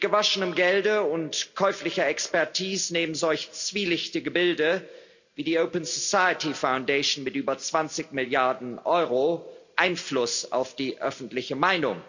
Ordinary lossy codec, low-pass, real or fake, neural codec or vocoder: none; 7.2 kHz; real; none